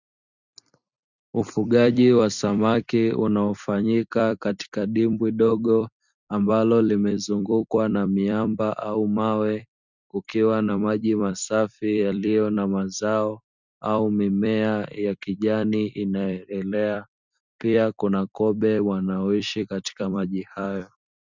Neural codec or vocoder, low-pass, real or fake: vocoder, 44.1 kHz, 128 mel bands every 256 samples, BigVGAN v2; 7.2 kHz; fake